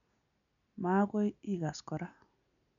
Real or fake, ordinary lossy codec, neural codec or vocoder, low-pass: real; none; none; 7.2 kHz